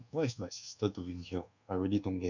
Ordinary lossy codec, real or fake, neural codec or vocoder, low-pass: none; fake; codec, 24 kHz, 1.2 kbps, DualCodec; 7.2 kHz